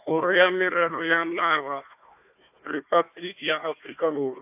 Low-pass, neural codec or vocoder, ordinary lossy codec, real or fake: 3.6 kHz; codec, 16 kHz, 1 kbps, FunCodec, trained on Chinese and English, 50 frames a second; AAC, 32 kbps; fake